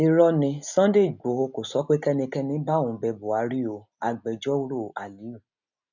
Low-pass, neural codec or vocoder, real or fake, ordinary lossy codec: 7.2 kHz; none; real; none